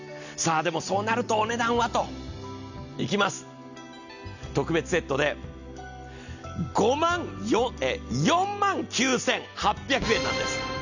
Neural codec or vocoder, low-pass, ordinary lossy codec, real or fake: none; 7.2 kHz; none; real